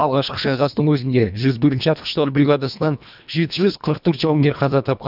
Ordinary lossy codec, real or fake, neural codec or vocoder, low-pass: none; fake; codec, 24 kHz, 1.5 kbps, HILCodec; 5.4 kHz